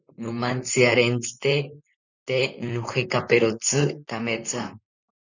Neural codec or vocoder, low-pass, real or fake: vocoder, 44.1 kHz, 128 mel bands, Pupu-Vocoder; 7.2 kHz; fake